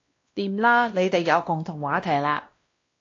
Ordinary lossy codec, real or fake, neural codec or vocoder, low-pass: AAC, 32 kbps; fake; codec, 16 kHz, 1 kbps, X-Codec, WavLM features, trained on Multilingual LibriSpeech; 7.2 kHz